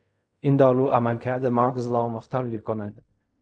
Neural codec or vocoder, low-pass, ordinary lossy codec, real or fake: codec, 16 kHz in and 24 kHz out, 0.4 kbps, LongCat-Audio-Codec, fine tuned four codebook decoder; 9.9 kHz; AAC, 64 kbps; fake